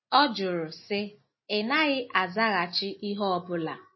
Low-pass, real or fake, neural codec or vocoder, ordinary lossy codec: 7.2 kHz; real; none; MP3, 24 kbps